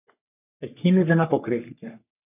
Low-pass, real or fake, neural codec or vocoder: 3.6 kHz; fake; codec, 44.1 kHz, 3.4 kbps, Pupu-Codec